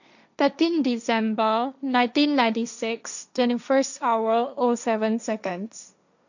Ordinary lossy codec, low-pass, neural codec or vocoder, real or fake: none; 7.2 kHz; codec, 16 kHz, 1.1 kbps, Voila-Tokenizer; fake